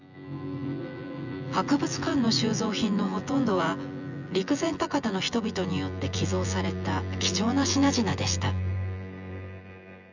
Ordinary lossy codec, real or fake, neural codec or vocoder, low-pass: none; fake; vocoder, 24 kHz, 100 mel bands, Vocos; 7.2 kHz